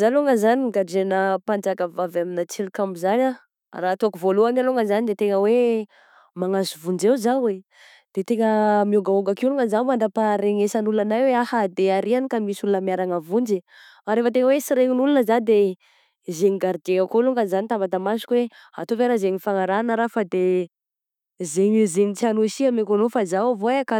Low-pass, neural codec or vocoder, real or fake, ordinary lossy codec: 19.8 kHz; autoencoder, 48 kHz, 128 numbers a frame, DAC-VAE, trained on Japanese speech; fake; none